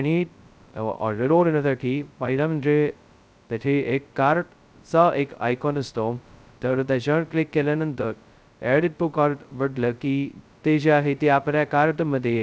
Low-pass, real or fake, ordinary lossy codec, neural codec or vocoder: none; fake; none; codec, 16 kHz, 0.2 kbps, FocalCodec